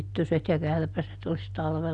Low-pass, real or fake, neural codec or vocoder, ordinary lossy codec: 10.8 kHz; real; none; none